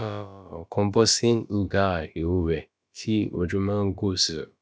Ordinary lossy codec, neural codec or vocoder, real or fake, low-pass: none; codec, 16 kHz, about 1 kbps, DyCAST, with the encoder's durations; fake; none